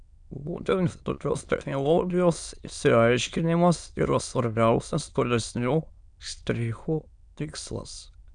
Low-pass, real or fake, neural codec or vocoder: 9.9 kHz; fake; autoencoder, 22.05 kHz, a latent of 192 numbers a frame, VITS, trained on many speakers